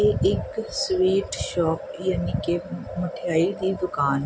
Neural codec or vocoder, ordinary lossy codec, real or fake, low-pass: none; none; real; none